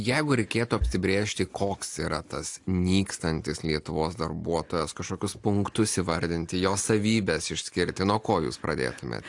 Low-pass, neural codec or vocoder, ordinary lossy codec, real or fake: 10.8 kHz; none; AAC, 64 kbps; real